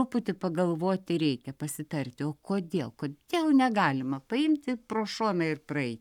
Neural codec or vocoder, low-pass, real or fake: codec, 44.1 kHz, 7.8 kbps, Pupu-Codec; 19.8 kHz; fake